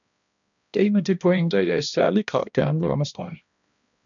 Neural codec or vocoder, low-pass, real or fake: codec, 16 kHz, 1 kbps, X-Codec, HuBERT features, trained on balanced general audio; 7.2 kHz; fake